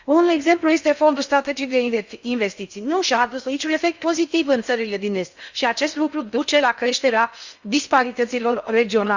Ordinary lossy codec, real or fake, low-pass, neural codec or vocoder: Opus, 64 kbps; fake; 7.2 kHz; codec, 16 kHz in and 24 kHz out, 0.6 kbps, FocalCodec, streaming, 4096 codes